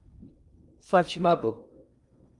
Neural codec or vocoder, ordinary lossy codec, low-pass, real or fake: codec, 16 kHz in and 24 kHz out, 0.6 kbps, FocalCodec, streaming, 2048 codes; Opus, 32 kbps; 10.8 kHz; fake